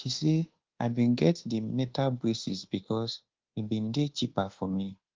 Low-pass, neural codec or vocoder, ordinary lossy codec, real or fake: 7.2 kHz; codec, 24 kHz, 1.2 kbps, DualCodec; Opus, 16 kbps; fake